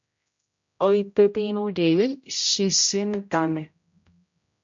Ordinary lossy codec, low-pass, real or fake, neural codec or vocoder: MP3, 48 kbps; 7.2 kHz; fake; codec, 16 kHz, 0.5 kbps, X-Codec, HuBERT features, trained on general audio